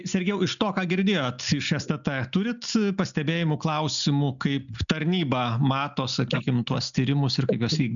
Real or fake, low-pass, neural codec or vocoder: real; 7.2 kHz; none